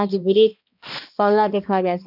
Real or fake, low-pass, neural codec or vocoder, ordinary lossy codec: fake; 5.4 kHz; codec, 16 kHz, 1 kbps, X-Codec, HuBERT features, trained on balanced general audio; none